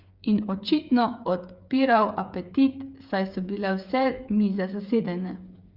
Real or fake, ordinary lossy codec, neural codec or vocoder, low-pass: fake; Opus, 64 kbps; codec, 16 kHz, 8 kbps, FreqCodec, smaller model; 5.4 kHz